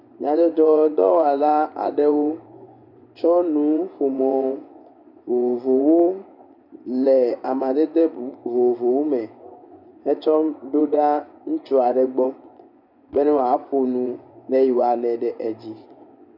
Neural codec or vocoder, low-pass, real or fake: vocoder, 24 kHz, 100 mel bands, Vocos; 5.4 kHz; fake